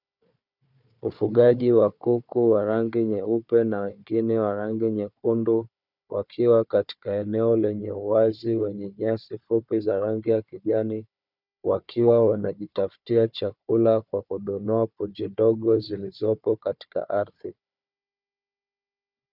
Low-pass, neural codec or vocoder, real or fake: 5.4 kHz; codec, 16 kHz, 4 kbps, FunCodec, trained on Chinese and English, 50 frames a second; fake